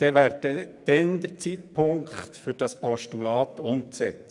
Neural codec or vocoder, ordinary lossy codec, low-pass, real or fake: codec, 44.1 kHz, 2.6 kbps, SNAC; none; 10.8 kHz; fake